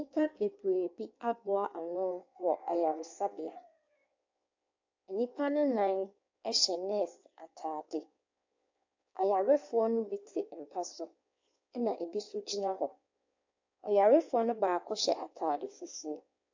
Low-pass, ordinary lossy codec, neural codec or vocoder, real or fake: 7.2 kHz; AAC, 48 kbps; codec, 16 kHz in and 24 kHz out, 1.1 kbps, FireRedTTS-2 codec; fake